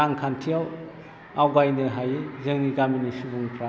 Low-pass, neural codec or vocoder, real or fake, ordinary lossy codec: none; none; real; none